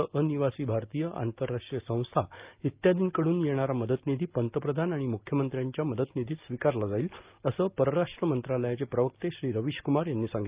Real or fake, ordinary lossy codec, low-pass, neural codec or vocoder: real; Opus, 32 kbps; 3.6 kHz; none